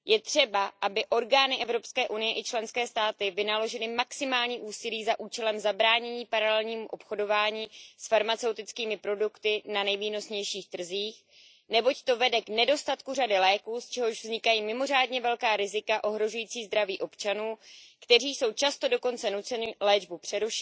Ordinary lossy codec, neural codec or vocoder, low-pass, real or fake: none; none; none; real